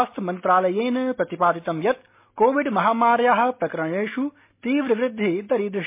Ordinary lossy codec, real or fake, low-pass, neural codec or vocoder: MP3, 24 kbps; real; 3.6 kHz; none